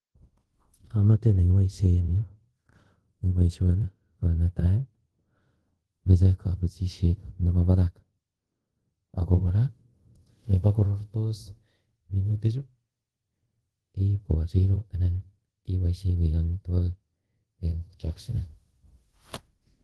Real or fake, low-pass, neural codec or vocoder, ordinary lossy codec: fake; 10.8 kHz; codec, 24 kHz, 0.5 kbps, DualCodec; Opus, 16 kbps